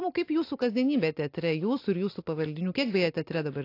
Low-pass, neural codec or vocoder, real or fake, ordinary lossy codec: 5.4 kHz; none; real; AAC, 32 kbps